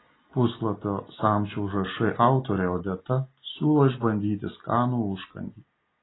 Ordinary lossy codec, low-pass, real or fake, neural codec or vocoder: AAC, 16 kbps; 7.2 kHz; real; none